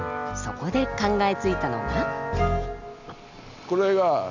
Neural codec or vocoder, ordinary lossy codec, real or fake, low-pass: none; none; real; 7.2 kHz